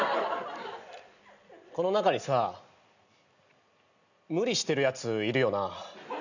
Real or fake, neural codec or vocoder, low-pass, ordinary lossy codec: real; none; 7.2 kHz; none